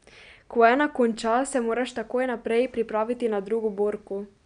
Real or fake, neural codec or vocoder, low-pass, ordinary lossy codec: real; none; 9.9 kHz; none